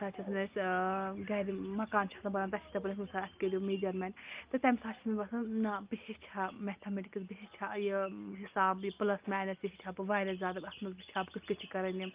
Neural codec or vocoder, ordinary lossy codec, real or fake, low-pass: none; Opus, 24 kbps; real; 3.6 kHz